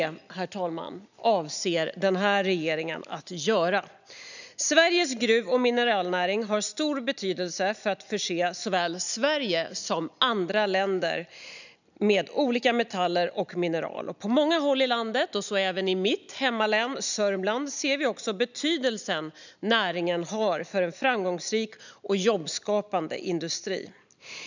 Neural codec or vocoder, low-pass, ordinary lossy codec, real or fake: none; 7.2 kHz; none; real